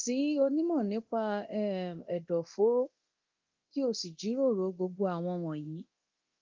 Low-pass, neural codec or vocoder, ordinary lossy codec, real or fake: 7.2 kHz; codec, 24 kHz, 0.9 kbps, DualCodec; Opus, 32 kbps; fake